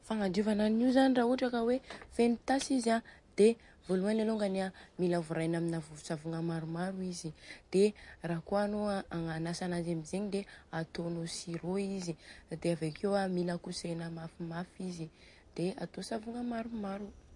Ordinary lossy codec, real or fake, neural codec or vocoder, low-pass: MP3, 48 kbps; real; none; 10.8 kHz